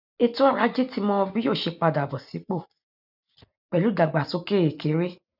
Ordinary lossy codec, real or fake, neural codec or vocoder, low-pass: none; real; none; 5.4 kHz